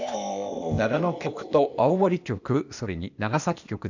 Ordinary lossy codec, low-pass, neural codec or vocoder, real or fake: none; 7.2 kHz; codec, 16 kHz, 0.8 kbps, ZipCodec; fake